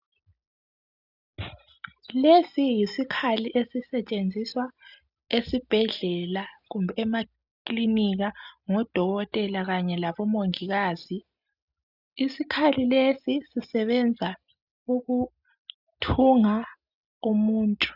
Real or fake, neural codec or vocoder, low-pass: real; none; 5.4 kHz